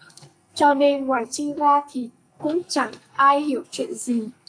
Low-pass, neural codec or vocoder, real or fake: 9.9 kHz; codec, 44.1 kHz, 2.6 kbps, SNAC; fake